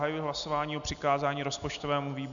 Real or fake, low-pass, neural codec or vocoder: real; 7.2 kHz; none